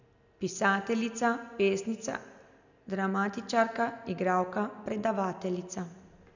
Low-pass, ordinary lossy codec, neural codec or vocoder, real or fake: 7.2 kHz; none; none; real